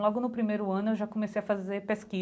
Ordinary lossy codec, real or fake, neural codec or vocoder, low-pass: none; real; none; none